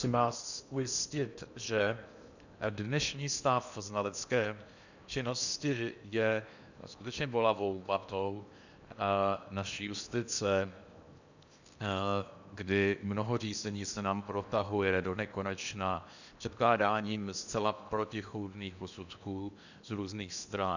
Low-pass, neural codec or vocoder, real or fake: 7.2 kHz; codec, 16 kHz in and 24 kHz out, 0.8 kbps, FocalCodec, streaming, 65536 codes; fake